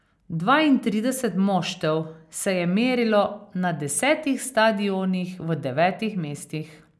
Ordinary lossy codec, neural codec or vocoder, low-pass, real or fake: none; none; none; real